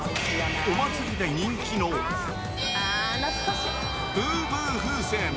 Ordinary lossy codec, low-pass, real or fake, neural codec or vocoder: none; none; real; none